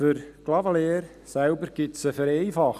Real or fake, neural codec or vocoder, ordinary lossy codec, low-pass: real; none; none; 14.4 kHz